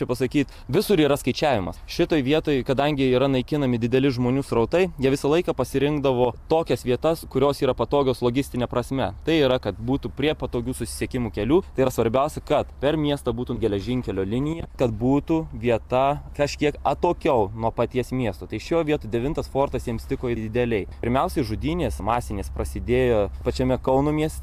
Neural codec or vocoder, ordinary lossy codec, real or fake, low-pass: none; AAC, 96 kbps; real; 14.4 kHz